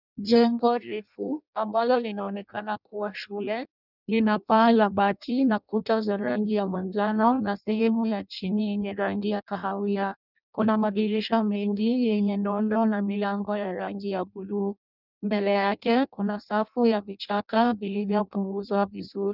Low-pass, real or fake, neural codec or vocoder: 5.4 kHz; fake; codec, 16 kHz in and 24 kHz out, 0.6 kbps, FireRedTTS-2 codec